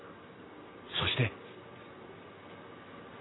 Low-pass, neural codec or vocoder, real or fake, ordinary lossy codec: 7.2 kHz; none; real; AAC, 16 kbps